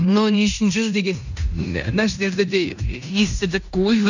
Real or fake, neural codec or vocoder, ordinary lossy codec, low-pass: fake; codec, 16 kHz in and 24 kHz out, 0.9 kbps, LongCat-Audio-Codec, fine tuned four codebook decoder; none; 7.2 kHz